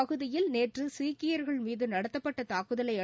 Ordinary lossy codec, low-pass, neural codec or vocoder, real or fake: none; none; none; real